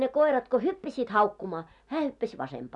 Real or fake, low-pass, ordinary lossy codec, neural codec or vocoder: real; 10.8 kHz; none; none